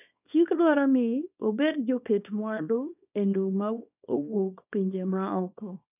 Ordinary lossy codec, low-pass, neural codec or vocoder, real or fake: none; 3.6 kHz; codec, 24 kHz, 0.9 kbps, WavTokenizer, small release; fake